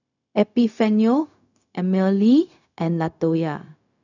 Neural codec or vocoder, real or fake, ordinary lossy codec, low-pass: codec, 16 kHz, 0.4 kbps, LongCat-Audio-Codec; fake; none; 7.2 kHz